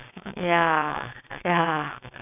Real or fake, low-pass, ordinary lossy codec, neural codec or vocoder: fake; 3.6 kHz; none; vocoder, 22.05 kHz, 80 mel bands, WaveNeXt